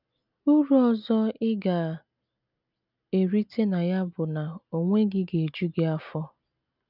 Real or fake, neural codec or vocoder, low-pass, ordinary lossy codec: real; none; 5.4 kHz; none